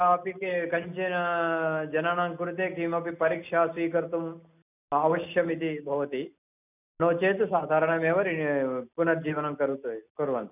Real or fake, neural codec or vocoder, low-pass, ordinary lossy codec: real; none; 3.6 kHz; none